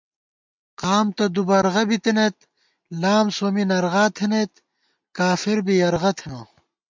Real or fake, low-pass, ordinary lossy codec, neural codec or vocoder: real; 7.2 kHz; MP3, 64 kbps; none